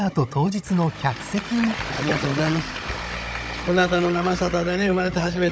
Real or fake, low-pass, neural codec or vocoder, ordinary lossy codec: fake; none; codec, 16 kHz, 16 kbps, FunCodec, trained on Chinese and English, 50 frames a second; none